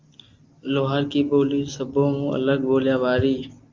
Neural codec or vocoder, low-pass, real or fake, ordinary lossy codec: none; 7.2 kHz; real; Opus, 24 kbps